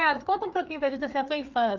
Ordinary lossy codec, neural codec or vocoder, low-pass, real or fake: Opus, 24 kbps; codec, 16 kHz, 4 kbps, FreqCodec, larger model; 7.2 kHz; fake